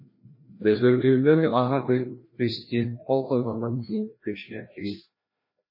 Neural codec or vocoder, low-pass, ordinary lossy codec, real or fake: codec, 16 kHz, 1 kbps, FreqCodec, larger model; 5.4 kHz; MP3, 24 kbps; fake